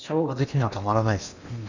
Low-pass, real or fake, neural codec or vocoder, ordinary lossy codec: 7.2 kHz; fake; codec, 16 kHz in and 24 kHz out, 0.8 kbps, FocalCodec, streaming, 65536 codes; none